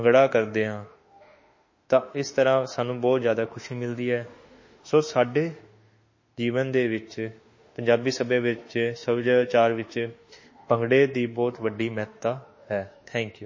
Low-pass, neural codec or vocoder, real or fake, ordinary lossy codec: 7.2 kHz; autoencoder, 48 kHz, 32 numbers a frame, DAC-VAE, trained on Japanese speech; fake; MP3, 32 kbps